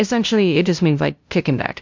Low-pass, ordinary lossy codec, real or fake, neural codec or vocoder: 7.2 kHz; MP3, 64 kbps; fake; codec, 16 kHz, 0.5 kbps, FunCodec, trained on LibriTTS, 25 frames a second